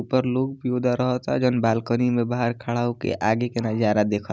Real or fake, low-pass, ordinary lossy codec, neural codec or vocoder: real; none; none; none